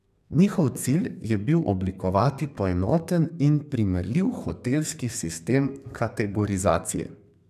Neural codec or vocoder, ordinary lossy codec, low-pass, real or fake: codec, 32 kHz, 1.9 kbps, SNAC; none; 14.4 kHz; fake